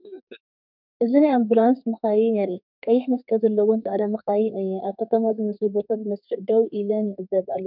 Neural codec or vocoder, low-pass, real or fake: codec, 32 kHz, 1.9 kbps, SNAC; 5.4 kHz; fake